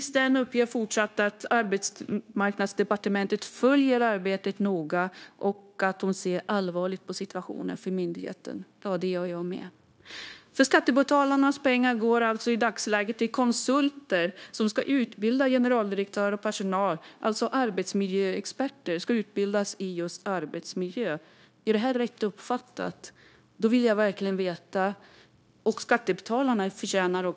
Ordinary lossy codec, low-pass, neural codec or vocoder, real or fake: none; none; codec, 16 kHz, 0.9 kbps, LongCat-Audio-Codec; fake